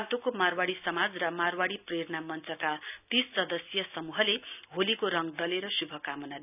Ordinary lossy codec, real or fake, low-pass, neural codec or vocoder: none; real; 3.6 kHz; none